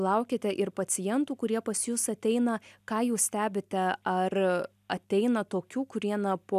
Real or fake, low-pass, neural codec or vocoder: real; 14.4 kHz; none